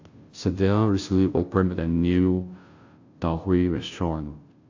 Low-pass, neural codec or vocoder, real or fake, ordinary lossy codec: 7.2 kHz; codec, 16 kHz, 0.5 kbps, FunCodec, trained on Chinese and English, 25 frames a second; fake; AAC, 48 kbps